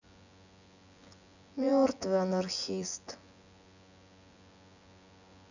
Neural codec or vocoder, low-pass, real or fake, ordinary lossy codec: vocoder, 24 kHz, 100 mel bands, Vocos; 7.2 kHz; fake; none